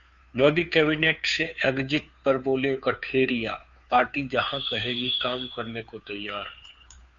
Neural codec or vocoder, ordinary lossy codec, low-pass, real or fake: codec, 16 kHz, 2 kbps, FunCodec, trained on Chinese and English, 25 frames a second; Opus, 64 kbps; 7.2 kHz; fake